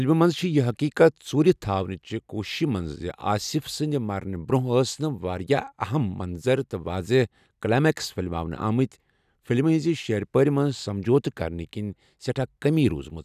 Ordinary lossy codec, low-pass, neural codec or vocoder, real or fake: none; 14.4 kHz; none; real